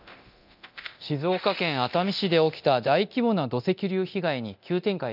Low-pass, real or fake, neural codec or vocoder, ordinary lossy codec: 5.4 kHz; fake; codec, 24 kHz, 0.9 kbps, DualCodec; none